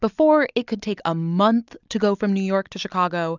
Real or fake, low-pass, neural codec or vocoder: real; 7.2 kHz; none